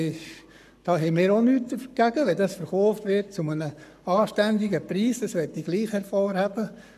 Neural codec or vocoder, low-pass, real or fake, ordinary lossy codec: codec, 44.1 kHz, 7.8 kbps, Pupu-Codec; 14.4 kHz; fake; none